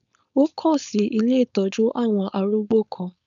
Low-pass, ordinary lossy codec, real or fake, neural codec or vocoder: 7.2 kHz; none; fake; codec, 16 kHz, 4.8 kbps, FACodec